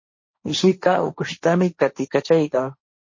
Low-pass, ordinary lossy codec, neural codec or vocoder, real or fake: 7.2 kHz; MP3, 32 kbps; codec, 16 kHz, 1.1 kbps, Voila-Tokenizer; fake